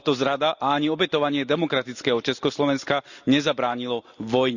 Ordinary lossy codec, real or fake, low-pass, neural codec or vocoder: Opus, 64 kbps; real; 7.2 kHz; none